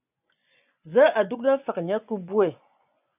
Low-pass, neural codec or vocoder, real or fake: 3.6 kHz; vocoder, 24 kHz, 100 mel bands, Vocos; fake